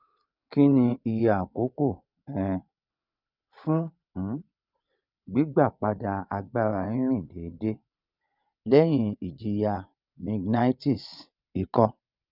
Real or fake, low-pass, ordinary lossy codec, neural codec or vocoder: fake; 5.4 kHz; none; vocoder, 22.05 kHz, 80 mel bands, WaveNeXt